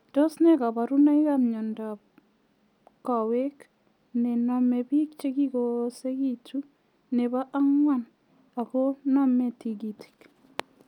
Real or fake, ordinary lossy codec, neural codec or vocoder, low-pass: real; none; none; 19.8 kHz